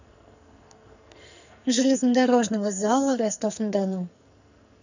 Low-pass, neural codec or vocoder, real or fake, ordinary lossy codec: 7.2 kHz; codec, 44.1 kHz, 2.6 kbps, SNAC; fake; none